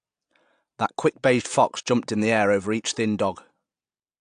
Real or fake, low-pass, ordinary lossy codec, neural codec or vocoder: real; 9.9 kHz; AAC, 64 kbps; none